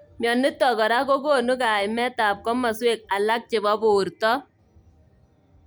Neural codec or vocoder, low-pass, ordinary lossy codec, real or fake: none; none; none; real